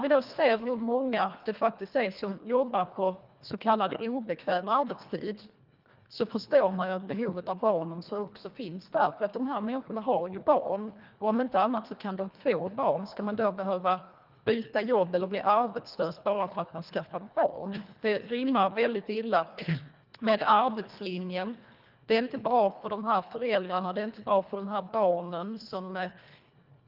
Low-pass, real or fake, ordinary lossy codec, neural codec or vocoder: 5.4 kHz; fake; Opus, 24 kbps; codec, 24 kHz, 1.5 kbps, HILCodec